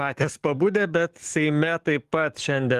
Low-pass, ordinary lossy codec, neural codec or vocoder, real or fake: 14.4 kHz; Opus, 24 kbps; codec, 44.1 kHz, 7.8 kbps, DAC; fake